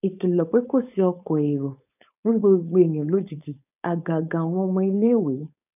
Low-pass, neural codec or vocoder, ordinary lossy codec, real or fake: 3.6 kHz; codec, 16 kHz, 4.8 kbps, FACodec; none; fake